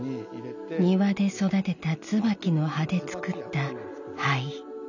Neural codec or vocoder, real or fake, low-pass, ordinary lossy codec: none; real; 7.2 kHz; none